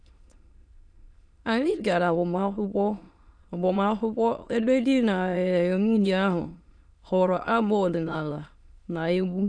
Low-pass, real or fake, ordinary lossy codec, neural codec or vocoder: 9.9 kHz; fake; none; autoencoder, 22.05 kHz, a latent of 192 numbers a frame, VITS, trained on many speakers